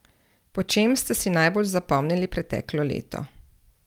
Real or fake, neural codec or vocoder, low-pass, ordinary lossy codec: real; none; 19.8 kHz; Opus, 32 kbps